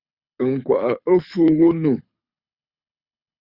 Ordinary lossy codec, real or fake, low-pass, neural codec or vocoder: Opus, 64 kbps; fake; 5.4 kHz; codec, 24 kHz, 6 kbps, HILCodec